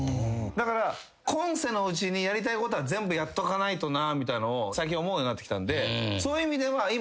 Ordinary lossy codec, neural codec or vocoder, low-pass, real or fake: none; none; none; real